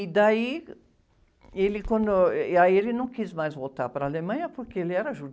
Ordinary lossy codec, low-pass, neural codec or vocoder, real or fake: none; none; none; real